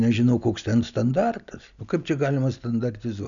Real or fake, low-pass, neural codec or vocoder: real; 7.2 kHz; none